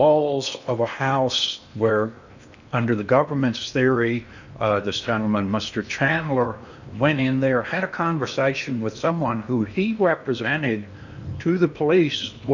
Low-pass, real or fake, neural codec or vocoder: 7.2 kHz; fake; codec, 16 kHz in and 24 kHz out, 0.8 kbps, FocalCodec, streaming, 65536 codes